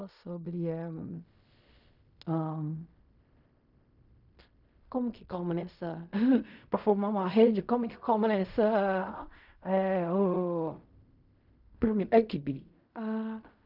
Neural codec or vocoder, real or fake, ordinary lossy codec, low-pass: codec, 16 kHz in and 24 kHz out, 0.4 kbps, LongCat-Audio-Codec, fine tuned four codebook decoder; fake; none; 5.4 kHz